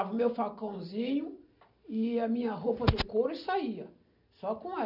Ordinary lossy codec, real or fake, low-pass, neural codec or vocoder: none; real; 5.4 kHz; none